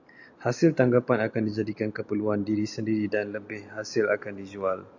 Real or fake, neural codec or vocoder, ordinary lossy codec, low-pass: real; none; AAC, 48 kbps; 7.2 kHz